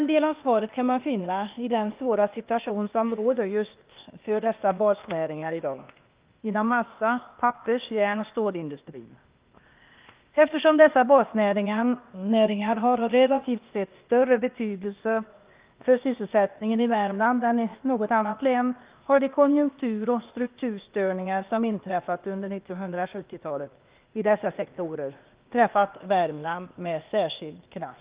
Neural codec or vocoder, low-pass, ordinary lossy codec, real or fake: codec, 16 kHz, 0.8 kbps, ZipCodec; 3.6 kHz; Opus, 32 kbps; fake